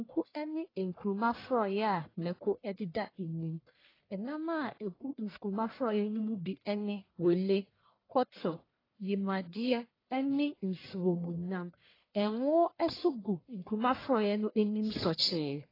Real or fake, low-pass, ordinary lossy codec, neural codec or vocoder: fake; 5.4 kHz; AAC, 24 kbps; codec, 44.1 kHz, 1.7 kbps, Pupu-Codec